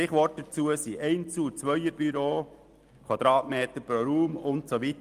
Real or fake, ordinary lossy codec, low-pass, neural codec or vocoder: real; Opus, 32 kbps; 14.4 kHz; none